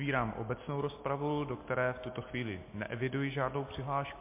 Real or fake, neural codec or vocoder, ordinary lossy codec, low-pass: real; none; MP3, 24 kbps; 3.6 kHz